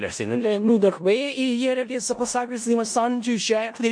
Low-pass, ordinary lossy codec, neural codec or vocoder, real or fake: 9.9 kHz; MP3, 48 kbps; codec, 16 kHz in and 24 kHz out, 0.4 kbps, LongCat-Audio-Codec, four codebook decoder; fake